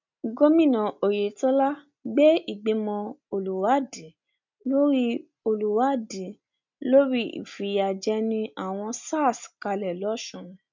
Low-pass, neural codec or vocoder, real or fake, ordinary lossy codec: 7.2 kHz; none; real; MP3, 64 kbps